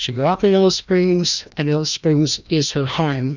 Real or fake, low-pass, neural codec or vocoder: fake; 7.2 kHz; codec, 16 kHz, 1 kbps, FreqCodec, larger model